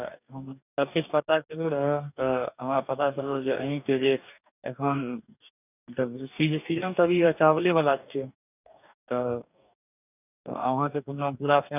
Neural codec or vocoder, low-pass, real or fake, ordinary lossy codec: codec, 44.1 kHz, 2.6 kbps, DAC; 3.6 kHz; fake; none